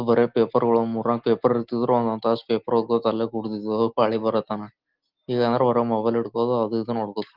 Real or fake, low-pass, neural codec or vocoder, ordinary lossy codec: real; 5.4 kHz; none; Opus, 16 kbps